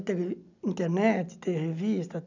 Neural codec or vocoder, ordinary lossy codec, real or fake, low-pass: none; none; real; 7.2 kHz